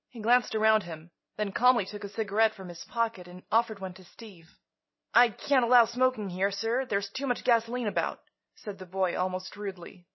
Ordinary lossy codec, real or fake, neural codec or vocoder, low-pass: MP3, 24 kbps; real; none; 7.2 kHz